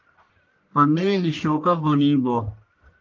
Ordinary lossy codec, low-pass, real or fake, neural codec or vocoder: Opus, 32 kbps; 7.2 kHz; fake; codec, 44.1 kHz, 1.7 kbps, Pupu-Codec